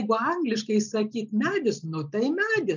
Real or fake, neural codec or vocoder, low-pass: real; none; 7.2 kHz